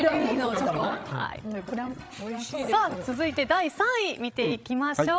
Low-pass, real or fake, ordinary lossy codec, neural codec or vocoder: none; fake; none; codec, 16 kHz, 16 kbps, FreqCodec, larger model